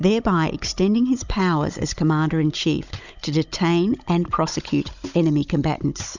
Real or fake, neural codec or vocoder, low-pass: fake; codec, 16 kHz, 16 kbps, FunCodec, trained on Chinese and English, 50 frames a second; 7.2 kHz